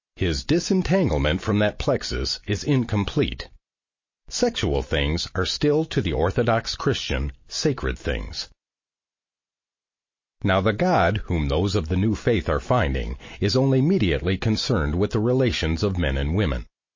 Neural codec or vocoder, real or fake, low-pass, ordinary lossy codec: none; real; 7.2 kHz; MP3, 32 kbps